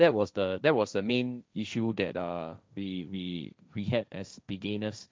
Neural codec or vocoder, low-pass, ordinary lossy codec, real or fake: codec, 16 kHz, 1.1 kbps, Voila-Tokenizer; none; none; fake